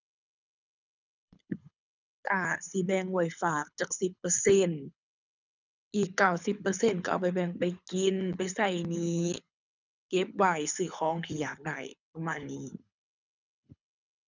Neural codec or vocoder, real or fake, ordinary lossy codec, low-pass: codec, 24 kHz, 6 kbps, HILCodec; fake; none; 7.2 kHz